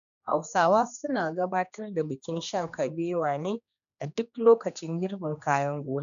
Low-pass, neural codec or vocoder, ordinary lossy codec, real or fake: 7.2 kHz; codec, 16 kHz, 2 kbps, X-Codec, HuBERT features, trained on general audio; none; fake